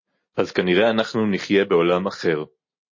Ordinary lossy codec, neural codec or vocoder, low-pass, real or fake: MP3, 32 kbps; none; 7.2 kHz; real